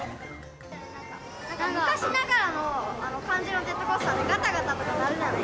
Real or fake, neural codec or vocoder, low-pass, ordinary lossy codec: real; none; none; none